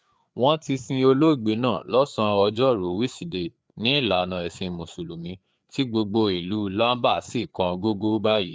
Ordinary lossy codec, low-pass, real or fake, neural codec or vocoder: none; none; fake; codec, 16 kHz, 4 kbps, FreqCodec, larger model